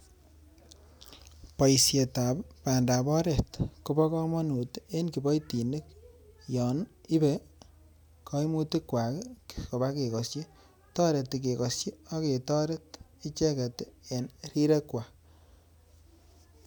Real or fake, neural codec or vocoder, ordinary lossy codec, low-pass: real; none; none; none